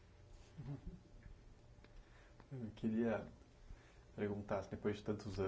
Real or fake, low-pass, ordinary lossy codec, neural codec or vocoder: real; none; none; none